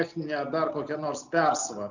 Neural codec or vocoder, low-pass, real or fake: none; 7.2 kHz; real